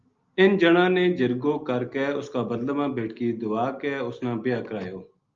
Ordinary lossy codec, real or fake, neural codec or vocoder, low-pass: Opus, 32 kbps; real; none; 7.2 kHz